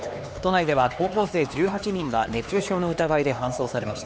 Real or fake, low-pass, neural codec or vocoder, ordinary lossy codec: fake; none; codec, 16 kHz, 2 kbps, X-Codec, HuBERT features, trained on LibriSpeech; none